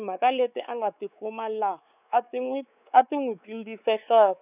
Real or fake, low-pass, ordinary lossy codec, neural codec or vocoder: fake; 3.6 kHz; none; codec, 16 kHz, 4 kbps, X-Codec, WavLM features, trained on Multilingual LibriSpeech